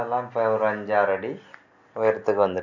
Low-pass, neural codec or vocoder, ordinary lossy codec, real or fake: 7.2 kHz; none; none; real